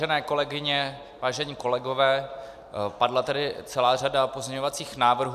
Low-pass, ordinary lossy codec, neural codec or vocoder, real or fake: 14.4 kHz; MP3, 96 kbps; none; real